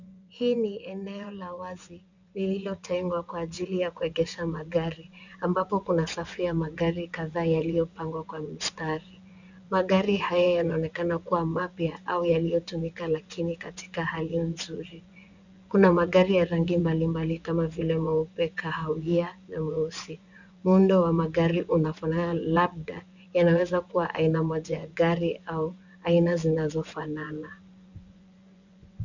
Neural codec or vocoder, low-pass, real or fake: vocoder, 24 kHz, 100 mel bands, Vocos; 7.2 kHz; fake